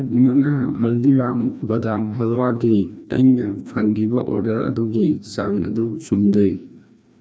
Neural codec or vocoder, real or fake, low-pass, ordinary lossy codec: codec, 16 kHz, 1 kbps, FreqCodec, larger model; fake; none; none